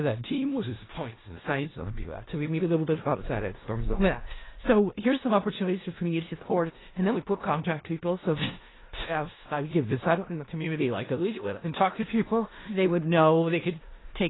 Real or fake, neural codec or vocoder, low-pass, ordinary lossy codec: fake; codec, 16 kHz in and 24 kHz out, 0.4 kbps, LongCat-Audio-Codec, four codebook decoder; 7.2 kHz; AAC, 16 kbps